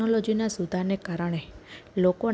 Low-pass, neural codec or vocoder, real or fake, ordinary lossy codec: none; none; real; none